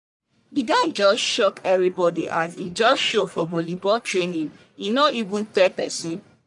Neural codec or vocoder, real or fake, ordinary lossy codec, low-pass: codec, 44.1 kHz, 1.7 kbps, Pupu-Codec; fake; none; 10.8 kHz